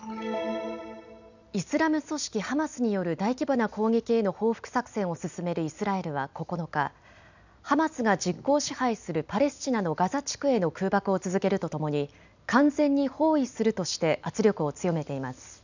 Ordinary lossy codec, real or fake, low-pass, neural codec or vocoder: none; real; 7.2 kHz; none